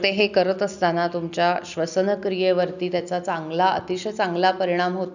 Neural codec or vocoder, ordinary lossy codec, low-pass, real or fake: none; none; 7.2 kHz; real